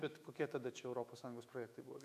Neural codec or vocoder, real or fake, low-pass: autoencoder, 48 kHz, 128 numbers a frame, DAC-VAE, trained on Japanese speech; fake; 14.4 kHz